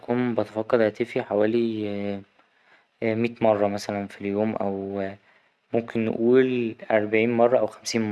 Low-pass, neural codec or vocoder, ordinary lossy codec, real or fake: none; none; none; real